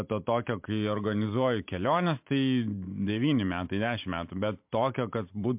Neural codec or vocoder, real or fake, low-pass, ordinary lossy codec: vocoder, 44.1 kHz, 128 mel bands every 512 samples, BigVGAN v2; fake; 3.6 kHz; MP3, 32 kbps